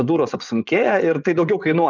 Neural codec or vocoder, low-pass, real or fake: vocoder, 24 kHz, 100 mel bands, Vocos; 7.2 kHz; fake